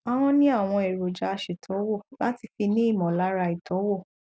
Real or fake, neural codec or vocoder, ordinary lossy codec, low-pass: real; none; none; none